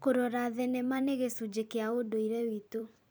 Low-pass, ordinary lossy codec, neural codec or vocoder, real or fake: none; none; vocoder, 44.1 kHz, 128 mel bands every 256 samples, BigVGAN v2; fake